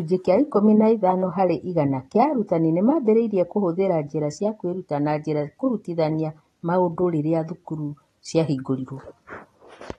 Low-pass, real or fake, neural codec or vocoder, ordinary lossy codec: 19.8 kHz; real; none; AAC, 32 kbps